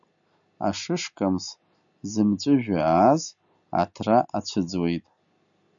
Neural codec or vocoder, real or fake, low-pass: none; real; 7.2 kHz